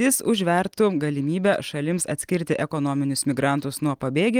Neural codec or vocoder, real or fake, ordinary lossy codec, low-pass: none; real; Opus, 24 kbps; 19.8 kHz